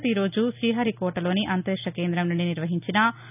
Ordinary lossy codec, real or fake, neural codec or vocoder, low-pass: none; real; none; 3.6 kHz